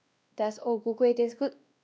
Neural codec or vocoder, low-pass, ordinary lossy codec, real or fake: codec, 16 kHz, 1 kbps, X-Codec, WavLM features, trained on Multilingual LibriSpeech; none; none; fake